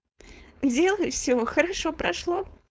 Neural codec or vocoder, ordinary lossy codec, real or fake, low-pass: codec, 16 kHz, 4.8 kbps, FACodec; none; fake; none